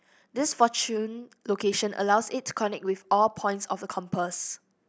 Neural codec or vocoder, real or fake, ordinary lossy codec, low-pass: none; real; none; none